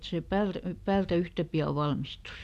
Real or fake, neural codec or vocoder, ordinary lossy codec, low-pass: real; none; none; 14.4 kHz